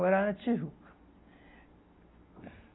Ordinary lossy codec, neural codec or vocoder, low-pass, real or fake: AAC, 16 kbps; none; 7.2 kHz; real